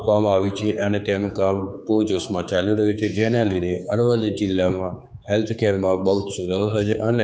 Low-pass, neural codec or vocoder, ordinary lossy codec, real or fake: none; codec, 16 kHz, 4 kbps, X-Codec, HuBERT features, trained on general audio; none; fake